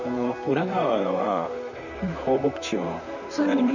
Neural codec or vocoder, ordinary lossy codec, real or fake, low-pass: vocoder, 44.1 kHz, 128 mel bands, Pupu-Vocoder; none; fake; 7.2 kHz